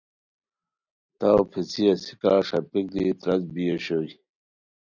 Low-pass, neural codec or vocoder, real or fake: 7.2 kHz; none; real